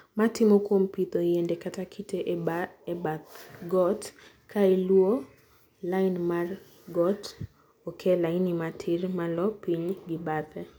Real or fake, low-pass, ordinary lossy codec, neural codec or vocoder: real; none; none; none